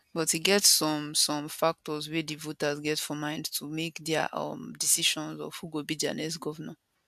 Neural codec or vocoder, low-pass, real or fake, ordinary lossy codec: none; 14.4 kHz; real; AAC, 96 kbps